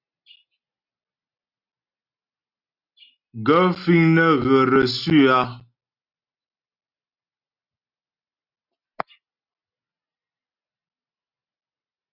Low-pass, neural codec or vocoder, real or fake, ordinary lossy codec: 5.4 kHz; none; real; Opus, 64 kbps